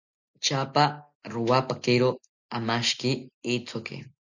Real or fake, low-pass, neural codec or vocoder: real; 7.2 kHz; none